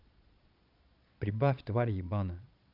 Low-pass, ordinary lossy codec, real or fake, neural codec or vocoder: 5.4 kHz; none; fake; vocoder, 22.05 kHz, 80 mel bands, Vocos